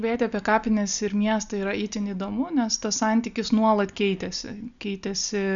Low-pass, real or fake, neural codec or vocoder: 7.2 kHz; real; none